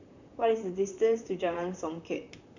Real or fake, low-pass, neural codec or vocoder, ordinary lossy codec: fake; 7.2 kHz; vocoder, 44.1 kHz, 128 mel bands, Pupu-Vocoder; none